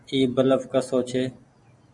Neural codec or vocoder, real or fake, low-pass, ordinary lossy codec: none; real; 10.8 kHz; MP3, 96 kbps